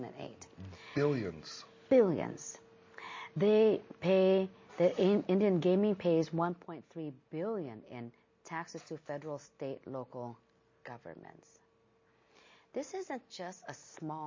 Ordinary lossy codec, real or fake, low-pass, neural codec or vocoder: MP3, 32 kbps; real; 7.2 kHz; none